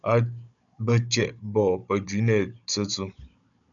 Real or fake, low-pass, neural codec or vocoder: fake; 7.2 kHz; codec, 16 kHz, 16 kbps, FunCodec, trained on Chinese and English, 50 frames a second